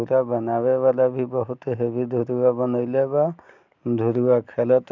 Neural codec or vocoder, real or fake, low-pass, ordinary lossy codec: none; real; 7.2 kHz; none